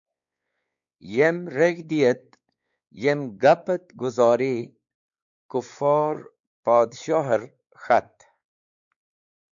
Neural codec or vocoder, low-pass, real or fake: codec, 16 kHz, 4 kbps, X-Codec, WavLM features, trained on Multilingual LibriSpeech; 7.2 kHz; fake